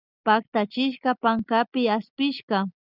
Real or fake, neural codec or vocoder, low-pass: real; none; 5.4 kHz